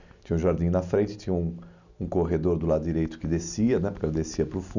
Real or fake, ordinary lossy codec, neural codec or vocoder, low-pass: real; none; none; 7.2 kHz